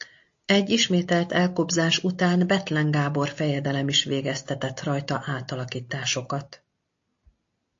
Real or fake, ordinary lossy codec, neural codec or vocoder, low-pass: real; AAC, 48 kbps; none; 7.2 kHz